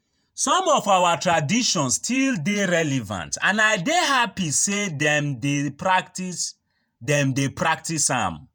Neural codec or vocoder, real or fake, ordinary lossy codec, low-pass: vocoder, 48 kHz, 128 mel bands, Vocos; fake; none; none